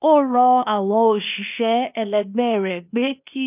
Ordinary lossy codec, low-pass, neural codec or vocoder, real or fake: none; 3.6 kHz; codec, 16 kHz, 0.8 kbps, ZipCodec; fake